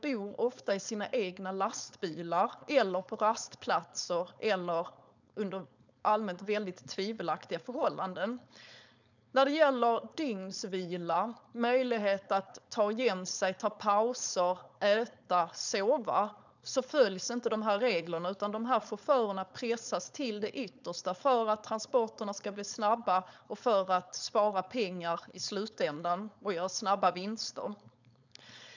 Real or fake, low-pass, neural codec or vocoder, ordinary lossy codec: fake; 7.2 kHz; codec, 16 kHz, 4.8 kbps, FACodec; none